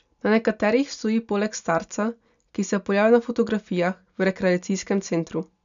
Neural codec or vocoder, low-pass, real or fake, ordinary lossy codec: none; 7.2 kHz; real; none